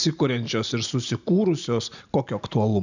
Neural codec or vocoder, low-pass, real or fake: none; 7.2 kHz; real